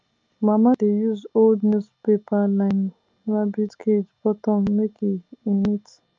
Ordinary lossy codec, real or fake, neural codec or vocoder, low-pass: Opus, 24 kbps; real; none; 7.2 kHz